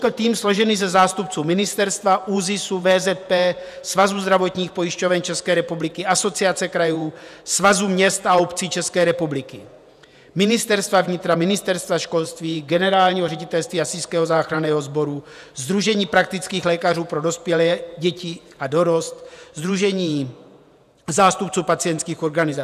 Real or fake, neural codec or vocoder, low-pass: fake; vocoder, 48 kHz, 128 mel bands, Vocos; 14.4 kHz